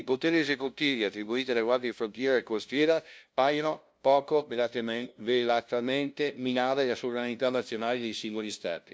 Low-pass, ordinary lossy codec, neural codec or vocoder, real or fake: none; none; codec, 16 kHz, 0.5 kbps, FunCodec, trained on LibriTTS, 25 frames a second; fake